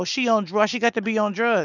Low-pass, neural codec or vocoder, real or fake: 7.2 kHz; none; real